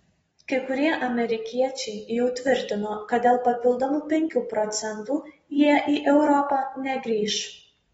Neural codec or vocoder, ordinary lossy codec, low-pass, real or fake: none; AAC, 24 kbps; 14.4 kHz; real